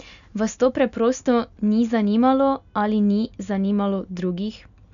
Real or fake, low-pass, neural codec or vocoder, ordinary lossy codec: real; 7.2 kHz; none; none